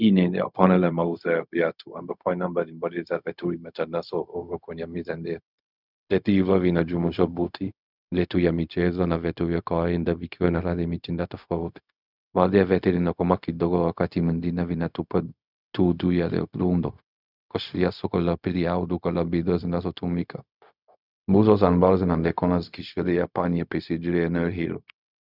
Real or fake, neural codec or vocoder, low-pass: fake; codec, 16 kHz, 0.4 kbps, LongCat-Audio-Codec; 5.4 kHz